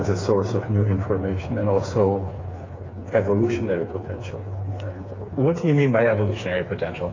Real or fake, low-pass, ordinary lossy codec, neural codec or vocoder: fake; 7.2 kHz; AAC, 32 kbps; codec, 16 kHz, 4 kbps, FreqCodec, smaller model